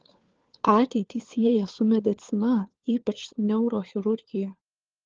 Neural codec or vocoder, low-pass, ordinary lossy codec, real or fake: codec, 16 kHz, 4 kbps, FunCodec, trained on LibriTTS, 50 frames a second; 7.2 kHz; Opus, 16 kbps; fake